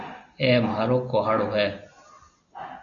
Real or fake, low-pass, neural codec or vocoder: real; 7.2 kHz; none